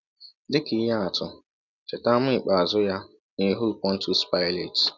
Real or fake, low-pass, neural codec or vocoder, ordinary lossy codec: real; 7.2 kHz; none; none